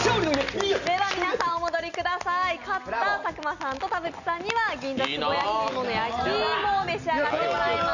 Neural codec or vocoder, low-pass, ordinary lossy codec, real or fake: none; 7.2 kHz; none; real